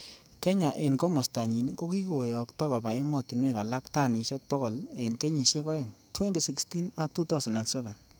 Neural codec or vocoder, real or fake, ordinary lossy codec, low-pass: codec, 44.1 kHz, 2.6 kbps, SNAC; fake; none; none